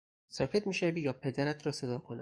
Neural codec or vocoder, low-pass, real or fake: codec, 44.1 kHz, 7.8 kbps, DAC; 9.9 kHz; fake